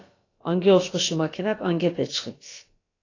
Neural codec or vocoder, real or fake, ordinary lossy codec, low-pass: codec, 16 kHz, about 1 kbps, DyCAST, with the encoder's durations; fake; AAC, 32 kbps; 7.2 kHz